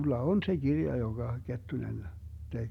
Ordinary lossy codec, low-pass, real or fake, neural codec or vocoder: none; 19.8 kHz; real; none